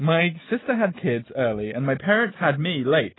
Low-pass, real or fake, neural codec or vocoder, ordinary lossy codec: 7.2 kHz; real; none; AAC, 16 kbps